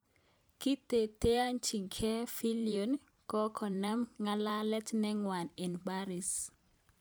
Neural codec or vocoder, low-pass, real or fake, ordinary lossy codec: vocoder, 44.1 kHz, 128 mel bands every 512 samples, BigVGAN v2; none; fake; none